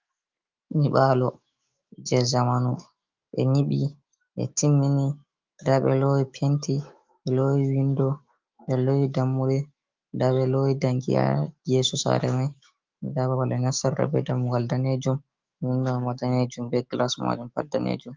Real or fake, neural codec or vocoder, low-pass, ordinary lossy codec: fake; autoencoder, 48 kHz, 128 numbers a frame, DAC-VAE, trained on Japanese speech; 7.2 kHz; Opus, 32 kbps